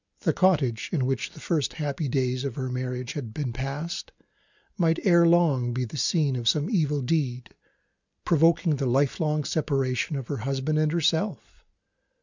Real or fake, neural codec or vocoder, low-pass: real; none; 7.2 kHz